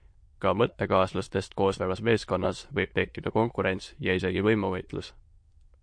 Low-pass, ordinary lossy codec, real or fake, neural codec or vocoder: 9.9 kHz; MP3, 48 kbps; fake; autoencoder, 22.05 kHz, a latent of 192 numbers a frame, VITS, trained on many speakers